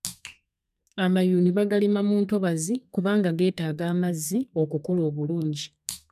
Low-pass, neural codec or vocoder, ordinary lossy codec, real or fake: 14.4 kHz; codec, 32 kHz, 1.9 kbps, SNAC; none; fake